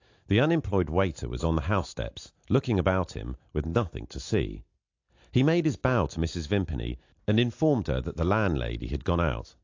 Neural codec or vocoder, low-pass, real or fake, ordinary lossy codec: none; 7.2 kHz; real; AAC, 48 kbps